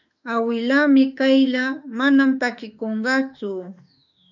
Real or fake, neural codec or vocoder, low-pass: fake; autoencoder, 48 kHz, 32 numbers a frame, DAC-VAE, trained on Japanese speech; 7.2 kHz